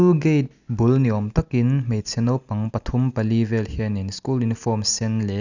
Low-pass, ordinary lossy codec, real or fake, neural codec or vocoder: 7.2 kHz; none; real; none